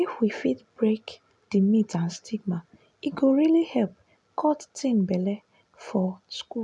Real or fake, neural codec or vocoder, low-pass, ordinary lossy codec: real; none; 10.8 kHz; none